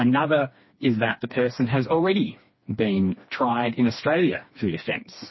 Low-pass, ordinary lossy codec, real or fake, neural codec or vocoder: 7.2 kHz; MP3, 24 kbps; fake; codec, 16 kHz, 2 kbps, FreqCodec, smaller model